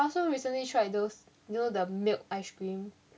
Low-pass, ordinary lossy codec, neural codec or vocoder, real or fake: none; none; none; real